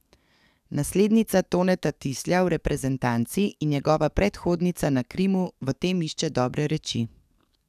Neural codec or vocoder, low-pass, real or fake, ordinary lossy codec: codec, 44.1 kHz, 7.8 kbps, DAC; 14.4 kHz; fake; none